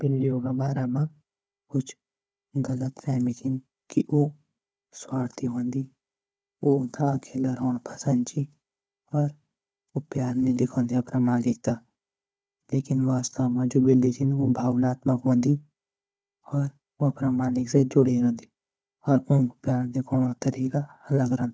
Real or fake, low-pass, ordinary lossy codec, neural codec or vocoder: fake; none; none; codec, 16 kHz, 4 kbps, FunCodec, trained on Chinese and English, 50 frames a second